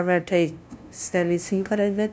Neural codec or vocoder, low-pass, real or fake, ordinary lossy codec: codec, 16 kHz, 0.5 kbps, FunCodec, trained on LibriTTS, 25 frames a second; none; fake; none